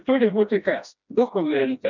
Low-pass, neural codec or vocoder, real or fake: 7.2 kHz; codec, 16 kHz, 1 kbps, FreqCodec, smaller model; fake